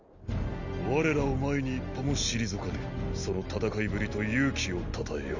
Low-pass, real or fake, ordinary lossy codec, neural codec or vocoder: 7.2 kHz; real; none; none